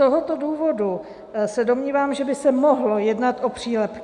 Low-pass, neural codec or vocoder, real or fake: 10.8 kHz; none; real